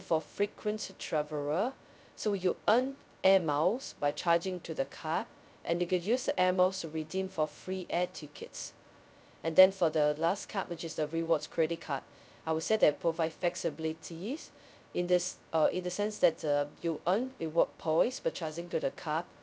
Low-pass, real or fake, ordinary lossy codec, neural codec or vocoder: none; fake; none; codec, 16 kHz, 0.2 kbps, FocalCodec